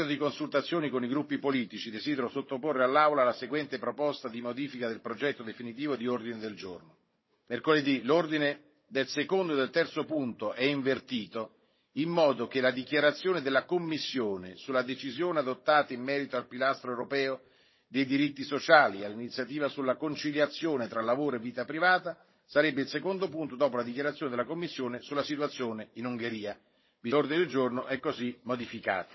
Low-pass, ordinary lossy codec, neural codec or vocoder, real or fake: 7.2 kHz; MP3, 24 kbps; codec, 16 kHz, 16 kbps, FunCodec, trained on Chinese and English, 50 frames a second; fake